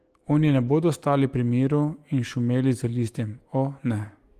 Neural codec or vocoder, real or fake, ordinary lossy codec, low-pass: autoencoder, 48 kHz, 128 numbers a frame, DAC-VAE, trained on Japanese speech; fake; Opus, 24 kbps; 14.4 kHz